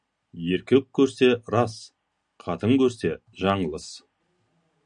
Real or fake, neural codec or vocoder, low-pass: real; none; 9.9 kHz